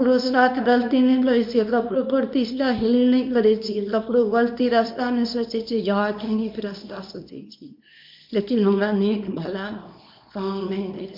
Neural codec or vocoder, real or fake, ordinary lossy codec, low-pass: codec, 24 kHz, 0.9 kbps, WavTokenizer, small release; fake; MP3, 48 kbps; 5.4 kHz